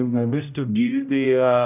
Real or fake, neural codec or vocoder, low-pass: fake; codec, 16 kHz, 0.5 kbps, X-Codec, HuBERT features, trained on general audio; 3.6 kHz